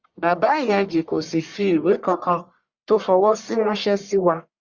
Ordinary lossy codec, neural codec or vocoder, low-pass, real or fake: Opus, 64 kbps; codec, 44.1 kHz, 1.7 kbps, Pupu-Codec; 7.2 kHz; fake